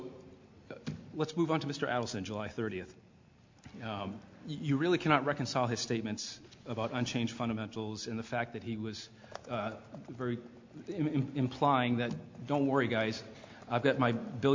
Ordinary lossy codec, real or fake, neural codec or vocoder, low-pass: MP3, 48 kbps; real; none; 7.2 kHz